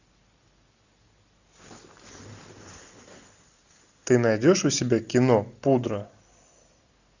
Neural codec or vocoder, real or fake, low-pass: none; real; 7.2 kHz